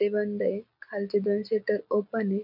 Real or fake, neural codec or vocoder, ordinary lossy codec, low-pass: real; none; none; 5.4 kHz